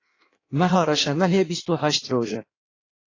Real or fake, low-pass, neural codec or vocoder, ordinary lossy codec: fake; 7.2 kHz; codec, 16 kHz in and 24 kHz out, 1.1 kbps, FireRedTTS-2 codec; AAC, 32 kbps